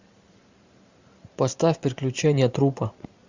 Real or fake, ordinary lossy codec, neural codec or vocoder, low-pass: fake; Opus, 64 kbps; vocoder, 44.1 kHz, 128 mel bands every 512 samples, BigVGAN v2; 7.2 kHz